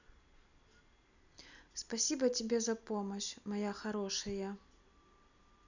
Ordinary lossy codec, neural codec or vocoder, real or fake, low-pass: none; none; real; 7.2 kHz